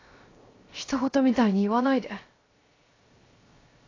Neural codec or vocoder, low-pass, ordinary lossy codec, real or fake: codec, 16 kHz, 0.7 kbps, FocalCodec; 7.2 kHz; AAC, 32 kbps; fake